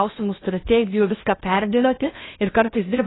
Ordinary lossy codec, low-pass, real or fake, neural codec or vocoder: AAC, 16 kbps; 7.2 kHz; fake; codec, 16 kHz in and 24 kHz out, 0.6 kbps, FocalCodec, streaming, 2048 codes